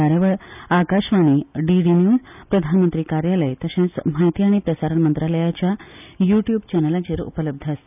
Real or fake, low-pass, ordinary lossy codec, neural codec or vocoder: real; 3.6 kHz; none; none